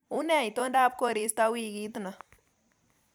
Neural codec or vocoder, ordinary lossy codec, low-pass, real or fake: vocoder, 44.1 kHz, 128 mel bands every 256 samples, BigVGAN v2; none; none; fake